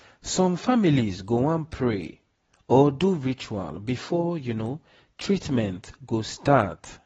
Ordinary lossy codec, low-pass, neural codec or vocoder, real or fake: AAC, 24 kbps; 19.8 kHz; none; real